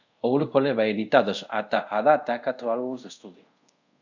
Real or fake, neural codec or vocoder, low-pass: fake; codec, 24 kHz, 0.5 kbps, DualCodec; 7.2 kHz